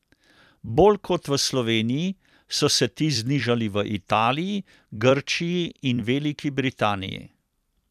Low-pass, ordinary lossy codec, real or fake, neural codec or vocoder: 14.4 kHz; none; fake; vocoder, 44.1 kHz, 128 mel bands every 256 samples, BigVGAN v2